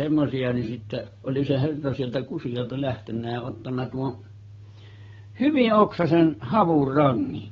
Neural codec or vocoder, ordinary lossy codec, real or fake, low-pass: codec, 16 kHz, 8 kbps, FunCodec, trained on Chinese and English, 25 frames a second; AAC, 24 kbps; fake; 7.2 kHz